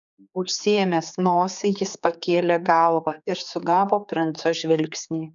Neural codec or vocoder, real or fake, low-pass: codec, 16 kHz, 4 kbps, X-Codec, HuBERT features, trained on general audio; fake; 7.2 kHz